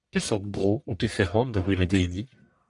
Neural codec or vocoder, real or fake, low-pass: codec, 44.1 kHz, 1.7 kbps, Pupu-Codec; fake; 10.8 kHz